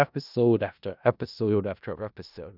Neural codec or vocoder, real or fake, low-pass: codec, 16 kHz in and 24 kHz out, 0.4 kbps, LongCat-Audio-Codec, four codebook decoder; fake; 5.4 kHz